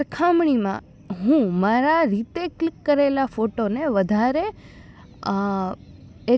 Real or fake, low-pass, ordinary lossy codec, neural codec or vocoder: real; none; none; none